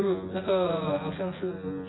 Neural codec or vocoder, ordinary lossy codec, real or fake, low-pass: vocoder, 24 kHz, 100 mel bands, Vocos; AAC, 16 kbps; fake; 7.2 kHz